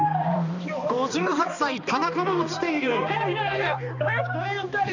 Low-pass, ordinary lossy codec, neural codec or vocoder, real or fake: 7.2 kHz; none; codec, 16 kHz, 2 kbps, X-Codec, HuBERT features, trained on balanced general audio; fake